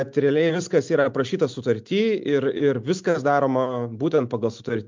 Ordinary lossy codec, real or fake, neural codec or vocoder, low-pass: MP3, 64 kbps; real; none; 7.2 kHz